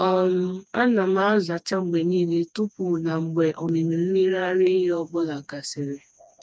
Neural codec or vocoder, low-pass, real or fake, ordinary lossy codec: codec, 16 kHz, 2 kbps, FreqCodec, smaller model; none; fake; none